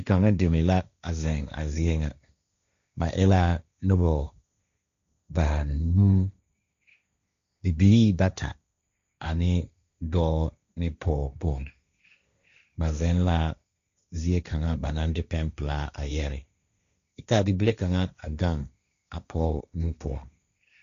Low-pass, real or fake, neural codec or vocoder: 7.2 kHz; fake; codec, 16 kHz, 1.1 kbps, Voila-Tokenizer